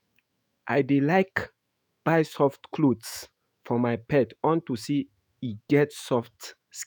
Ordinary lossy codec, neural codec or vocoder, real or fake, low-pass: none; autoencoder, 48 kHz, 128 numbers a frame, DAC-VAE, trained on Japanese speech; fake; none